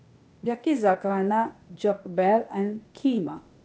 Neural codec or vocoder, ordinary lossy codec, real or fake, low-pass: codec, 16 kHz, 0.8 kbps, ZipCodec; none; fake; none